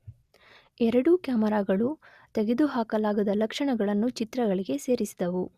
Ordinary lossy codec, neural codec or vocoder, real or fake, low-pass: Opus, 64 kbps; none; real; 14.4 kHz